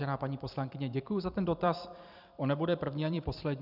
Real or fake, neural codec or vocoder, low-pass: fake; vocoder, 44.1 kHz, 128 mel bands every 256 samples, BigVGAN v2; 5.4 kHz